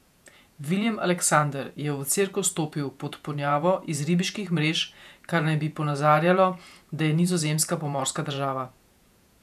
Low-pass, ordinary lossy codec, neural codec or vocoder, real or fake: 14.4 kHz; none; vocoder, 48 kHz, 128 mel bands, Vocos; fake